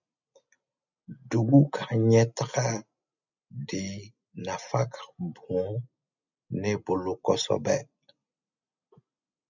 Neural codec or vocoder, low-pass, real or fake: none; 7.2 kHz; real